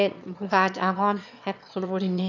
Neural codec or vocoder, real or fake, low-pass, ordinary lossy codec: autoencoder, 22.05 kHz, a latent of 192 numbers a frame, VITS, trained on one speaker; fake; 7.2 kHz; none